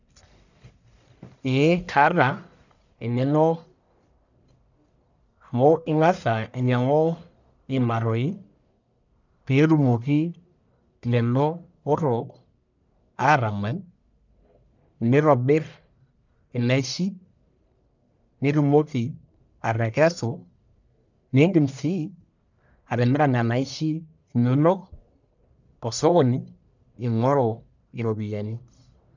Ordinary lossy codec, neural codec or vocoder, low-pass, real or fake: none; codec, 44.1 kHz, 1.7 kbps, Pupu-Codec; 7.2 kHz; fake